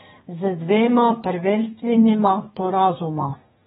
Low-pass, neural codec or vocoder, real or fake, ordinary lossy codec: 14.4 kHz; codec, 32 kHz, 1.9 kbps, SNAC; fake; AAC, 16 kbps